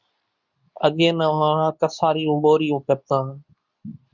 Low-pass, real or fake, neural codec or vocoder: 7.2 kHz; fake; codec, 24 kHz, 0.9 kbps, WavTokenizer, medium speech release version 2